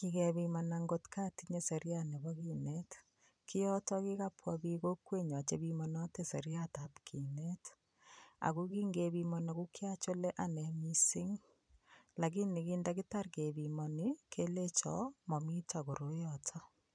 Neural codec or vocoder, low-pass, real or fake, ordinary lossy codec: vocoder, 44.1 kHz, 128 mel bands every 256 samples, BigVGAN v2; 9.9 kHz; fake; none